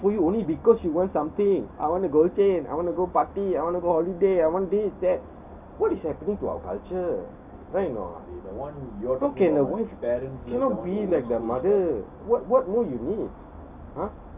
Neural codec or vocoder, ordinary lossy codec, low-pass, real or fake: none; none; 3.6 kHz; real